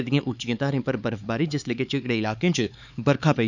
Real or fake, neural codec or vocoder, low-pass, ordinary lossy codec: fake; codec, 16 kHz, 4 kbps, X-Codec, HuBERT features, trained on LibriSpeech; 7.2 kHz; none